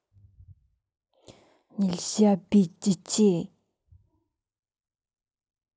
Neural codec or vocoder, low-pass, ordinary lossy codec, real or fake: none; none; none; real